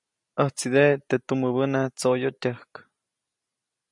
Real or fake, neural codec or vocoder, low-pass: real; none; 10.8 kHz